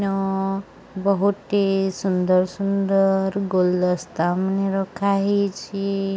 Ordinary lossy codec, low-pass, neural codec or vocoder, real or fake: none; none; none; real